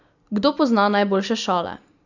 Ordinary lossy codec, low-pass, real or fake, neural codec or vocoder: none; 7.2 kHz; real; none